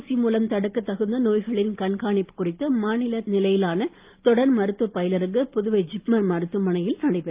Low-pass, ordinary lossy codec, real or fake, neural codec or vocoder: 3.6 kHz; Opus, 24 kbps; real; none